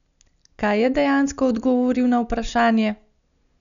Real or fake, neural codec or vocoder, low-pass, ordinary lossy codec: real; none; 7.2 kHz; none